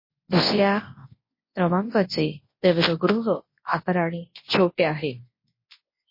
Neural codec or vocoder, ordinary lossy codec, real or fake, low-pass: codec, 24 kHz, 0.9 kbps, WavTokenizer, large speech release; MP3, 24 kbps; fake; 5.4 kHz